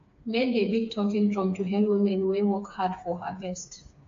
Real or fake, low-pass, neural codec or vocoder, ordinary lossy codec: fake; 7.2 kHz; codec, 16 kHz, 4 kbps, FreqCodec, smaller model; AAC, 48 kbps